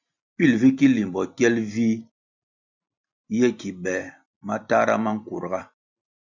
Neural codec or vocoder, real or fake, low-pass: vocoder, 44.1 kHz, 128 mel bands every 256 samples, BigVGAN v2; fake; 7.2 kHz